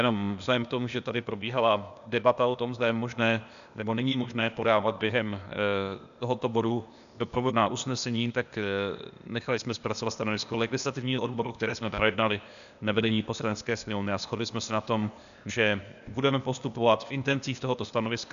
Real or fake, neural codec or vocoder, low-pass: fake; codec, 16 kHz, 0.8 kbps, ZipCodec; 7.2 kHz